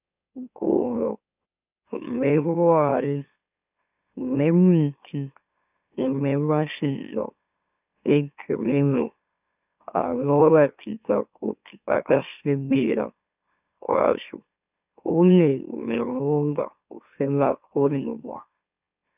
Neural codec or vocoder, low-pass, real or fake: autoencoder, 44.1 kHz, a latent of 192 numbers a frame, MeloTTS; 3.6 kHz; fake